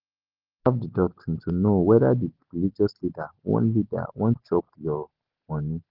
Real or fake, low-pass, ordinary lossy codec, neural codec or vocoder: real; 5.4 kHz; Opus, 16 kbps; none